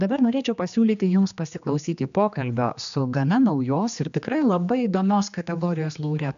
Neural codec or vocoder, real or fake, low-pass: codec, 16 kHz, 2 kbps, X-Codec, HuBERT features, trained on general audio; fake; 7.2 kHz